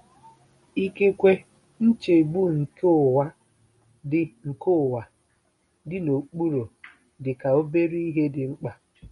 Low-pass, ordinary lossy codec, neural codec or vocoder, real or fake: 14.4 kHz; MP3, 48 kbps; none; real